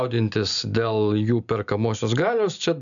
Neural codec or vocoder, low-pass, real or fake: none; 7.2 kHz; real